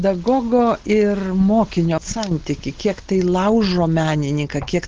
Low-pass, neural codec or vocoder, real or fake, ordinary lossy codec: 7.2 kHz; none; real; Opus, 16 kbps